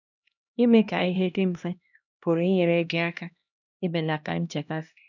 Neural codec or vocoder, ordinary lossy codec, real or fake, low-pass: codec, 16 kHz, 1 kbps, X-Codec, HuBERT features, trained on LibriSpeech; none; fake; 7.2 kHz